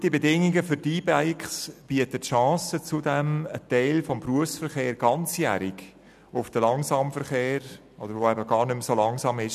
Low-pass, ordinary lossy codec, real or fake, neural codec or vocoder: 14.4 kHz; none; real; none